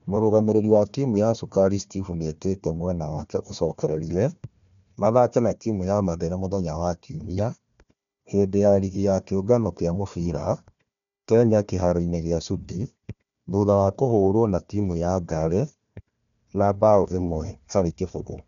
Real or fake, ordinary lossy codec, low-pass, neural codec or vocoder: fake; none; 7.2 kHz; codec, 16 kHz, 1 kbps, FunCodec, trained on Chinese and English, 50 frames a second